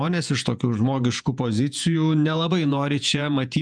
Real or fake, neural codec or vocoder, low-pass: fake; vocoder, 48 kHz, 128 mel bands, Vocos; 9.9 kHz